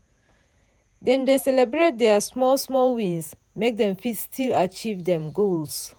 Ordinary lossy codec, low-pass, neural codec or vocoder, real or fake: none; none; vocoder, 48 kHz, 128 mel bands, Vocos; fake